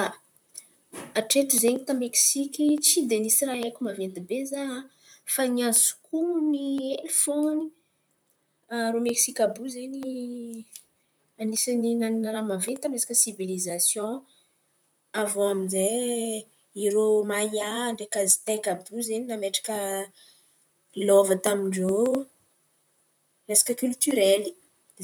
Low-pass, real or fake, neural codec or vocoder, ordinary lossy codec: none; fake; vocoder, 44.1 kHz, 128 mel bands, Pupu-Vocoder; none